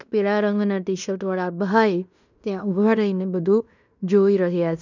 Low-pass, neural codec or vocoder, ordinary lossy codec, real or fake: 7.2 kHz; codec, 16 kHz in and 24 kHz out, 0.9 kbps, LongCat-Audio-Codec, fine tuned four codebook decoder; none; fake